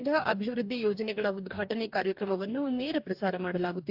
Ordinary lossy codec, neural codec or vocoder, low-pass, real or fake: AAC, 48 kbps; codec, 44.1 kHz, 2.6 kbps, DAC; 5.4 kHz; fake